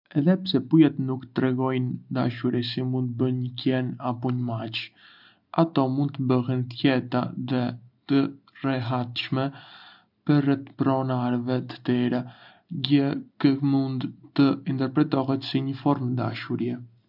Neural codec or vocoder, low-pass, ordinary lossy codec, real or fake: none; 5.4 kHz; none; real